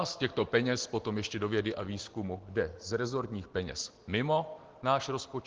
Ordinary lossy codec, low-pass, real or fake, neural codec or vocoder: Opus, 16 kbps; 7.2 kHz; real; none